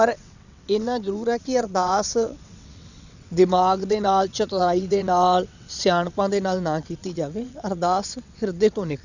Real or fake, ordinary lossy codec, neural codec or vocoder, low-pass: fake; none; vocoder, 22.05 kHz, 80 mel bands, WaveNeXt; 7.2 kHz